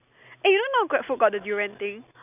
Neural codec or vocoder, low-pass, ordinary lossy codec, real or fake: none; 3.6 kHz; none; real